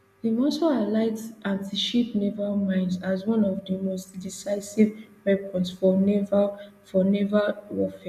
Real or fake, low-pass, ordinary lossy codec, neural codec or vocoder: real; 14.4 kHz; none; none